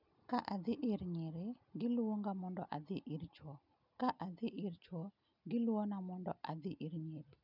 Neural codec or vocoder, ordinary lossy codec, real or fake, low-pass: none; none; real; 5.4 kHz